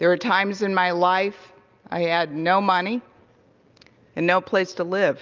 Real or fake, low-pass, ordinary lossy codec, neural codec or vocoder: real; 7.2 kHz; Opus, 32 kbps; none